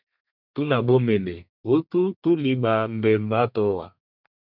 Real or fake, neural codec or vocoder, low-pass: fake; codec, 32 kHz, 1.9 kbps, SNAC; 5.4 kHz